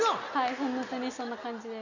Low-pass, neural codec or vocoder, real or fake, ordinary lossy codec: 7.2 kHz; none; real; none